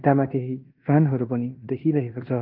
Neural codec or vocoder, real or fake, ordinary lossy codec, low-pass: codec, 16 kHz in and 24 kHz out, 0.9 kbps, LongCat-Audio-Codec, fine tuned four codebook decoder; fake; Opus, 32 kbps; 5.4 kHz